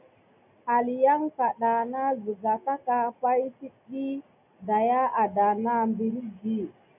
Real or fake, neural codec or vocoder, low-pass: real; none; 3.6 kHz